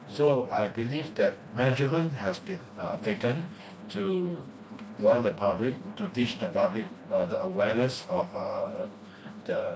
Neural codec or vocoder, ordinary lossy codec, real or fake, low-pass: codec, 16 kHz, 1 kbps, FreqCodec, smaller model; none; fake; none